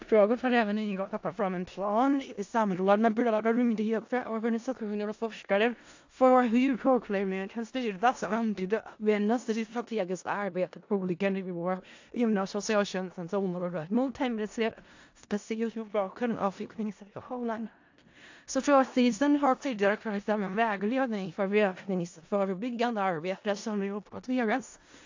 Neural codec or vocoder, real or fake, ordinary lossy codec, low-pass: codec, 16 kHz in and 24 kHz out, 0.4 kbps, LongCat-Audio-Codec, four codebook decoder; fake; AAC, 48 kbps; 7.2 kHz